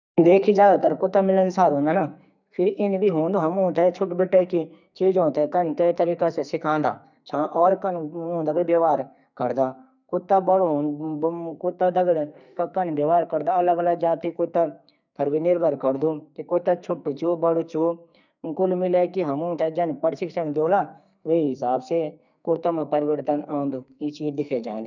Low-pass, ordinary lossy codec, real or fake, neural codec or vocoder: 7.2 kHz; none; fake; codec, 44.1 kHz, 2.6 kbps, SNAC